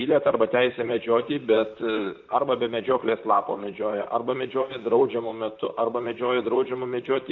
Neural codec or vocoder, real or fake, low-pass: vocoder, 44.1 kHz, 128 mel bands, Pupu-Vocoder; fake; 7.2 kHz